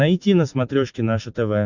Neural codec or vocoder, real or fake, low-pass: none; real; 7.2 kHz